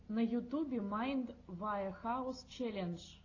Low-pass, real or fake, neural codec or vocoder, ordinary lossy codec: 7.2 kHz; real; none; AAC, 32 kbps